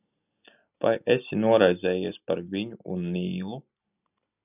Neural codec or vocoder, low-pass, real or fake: none; 3.6 kHz; real